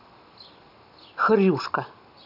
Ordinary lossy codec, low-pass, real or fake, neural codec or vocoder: none; 5.4 kHz; real; none